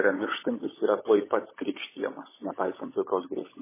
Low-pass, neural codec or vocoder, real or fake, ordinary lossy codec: 3.6 kHz; codec, 16 kHz, 16 kbps, FunCodec, trained on LibriTTS, 50 frames a second; fake; MP3, 16 kbps